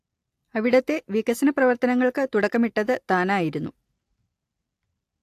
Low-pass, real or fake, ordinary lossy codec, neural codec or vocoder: 14.4 kHz; real; AAC, 64 kbps; none